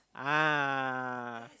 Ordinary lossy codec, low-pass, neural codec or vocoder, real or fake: none; none; none; real